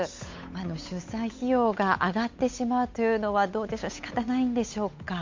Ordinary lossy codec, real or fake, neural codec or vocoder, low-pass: MP3, 48 kbps; fake; codec, 16 kHz, 8 kbps, FunCodec, trained on Chinese and English, 25 frames a second; 7.2 kHz